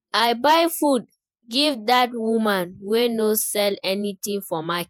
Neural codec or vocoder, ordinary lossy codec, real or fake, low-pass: vocoder, 48 kHz, 128 mel bands, Vocos; none; fake; none